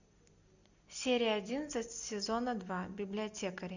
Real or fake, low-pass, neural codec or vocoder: real; 7.2 kHz; none